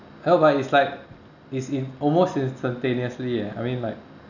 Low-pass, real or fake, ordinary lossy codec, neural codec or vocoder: 7.2 kHz; real; none; none